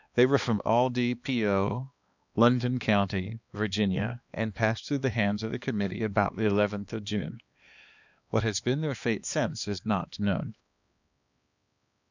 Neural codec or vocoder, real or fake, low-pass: codec, 16 kHz, 2 kbps, X-Codec, HuBERT features, trained on balanced general audio; fake; 7.2 kHz